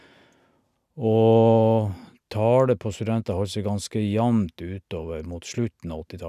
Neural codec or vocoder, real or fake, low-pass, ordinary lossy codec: none; real; 14.4 kHz; none